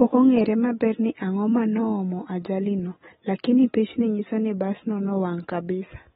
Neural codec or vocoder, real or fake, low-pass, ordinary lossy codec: none; real; 19.8 kHz; AAC, 16 kbps